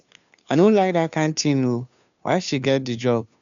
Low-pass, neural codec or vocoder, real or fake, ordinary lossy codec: 7.2 kHz; codec, 16 kHz, 2 kbps, FunCodec, trained on Chinese and English, 25 frames a second; fake; none